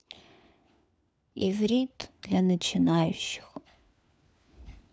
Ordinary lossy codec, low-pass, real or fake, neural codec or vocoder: none; none; fake; codec, 16 kHz, 4 kbps, FunCodec, trained on LibriTTS, 50 frames a second